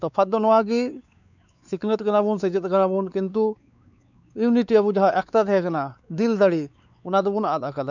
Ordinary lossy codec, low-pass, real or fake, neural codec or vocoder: none; 7.2 kHz; fake; codec, 16 kHz, 4 kbps, X-Codec, WavLM features, trained on Multilingual LibriSpeech